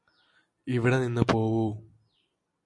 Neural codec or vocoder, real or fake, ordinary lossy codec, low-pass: none; real; MP3, 64 kbps; 10.8 kHz